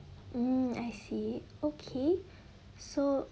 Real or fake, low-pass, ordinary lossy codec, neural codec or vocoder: real; none; none; none